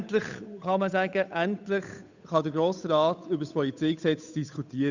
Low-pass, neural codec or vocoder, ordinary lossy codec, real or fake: 7.2 kHz; codec, 16 kHz, 8 kbps, FunCodec, trained on Chinese and English, 25 frames a second; none; fake